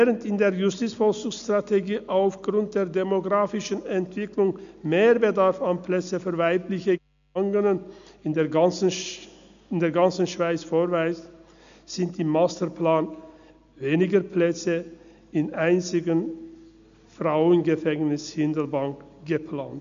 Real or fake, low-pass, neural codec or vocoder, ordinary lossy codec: real; 7.2 kHz; none; AAC, 96 kbps